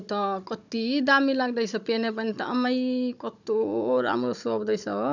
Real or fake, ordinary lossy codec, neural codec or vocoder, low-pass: fake; none; codec, 16 kHz, 16 kbps, FunCodec, trained on Chinese and English, 50 frames a second; 7.2 kHz